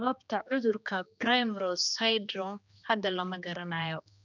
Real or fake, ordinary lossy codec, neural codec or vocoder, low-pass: fake; none; codec, 16 kHz, 2 kbps, X-Codec, HuBERT features, trained on general audio; 7.2 kHz